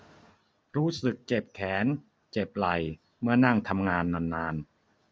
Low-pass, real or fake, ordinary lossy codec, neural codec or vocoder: none; real; none; none